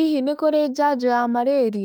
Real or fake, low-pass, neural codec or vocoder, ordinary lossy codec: fake; 19.8 kHz; autoencoder, 48 kHz, 32 numbers a frame, DAC-VAE, trained on Japanese speech; none